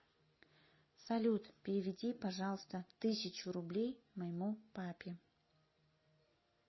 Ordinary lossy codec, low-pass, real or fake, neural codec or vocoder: MP3, 24 kbps; 7.2 kHz; real; none